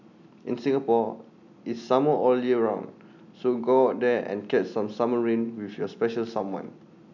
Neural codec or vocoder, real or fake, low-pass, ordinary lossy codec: none; real; 7.2 kHz; none